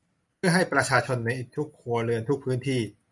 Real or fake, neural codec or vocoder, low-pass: real; none; 10.8 kHz